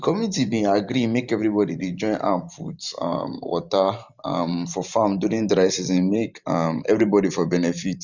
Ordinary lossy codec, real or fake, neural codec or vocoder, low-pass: none; fake; vocoder, 44.1 kHz, 128 mel bands every 512 samples, BigVGAN v2; 7.2 kHz